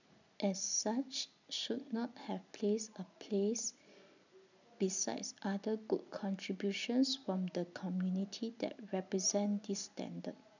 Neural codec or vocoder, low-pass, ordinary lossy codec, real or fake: none; 7.2 kHz; none; real